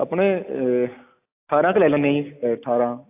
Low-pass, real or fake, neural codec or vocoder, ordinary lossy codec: 3.6 kHz; real; none; AAC, 32 kbps